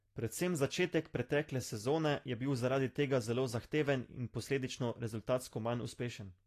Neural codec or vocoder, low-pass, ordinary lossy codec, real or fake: none; 14.4 kHz; AAC, 48 kbps; real